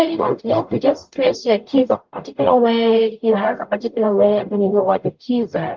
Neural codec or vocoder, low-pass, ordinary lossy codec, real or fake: codec, 44.1 kHz, 0.9 kbps, DAC; 7.2 kHz; Opus, 24 kbps; fake